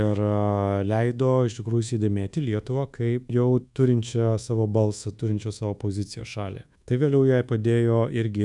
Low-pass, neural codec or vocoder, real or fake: 10.8 kHz; codec, 24 kHz, 1.2 kbps, DualCodec; fake